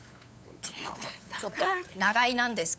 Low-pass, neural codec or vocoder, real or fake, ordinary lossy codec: none; codec, 16 kHz, 8 kbps, FunCodec, trained on LibriTTS, 25 frames a second; fake; none